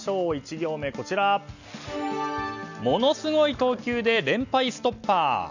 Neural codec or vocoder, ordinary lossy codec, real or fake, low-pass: none; none; real; 7.2 kHz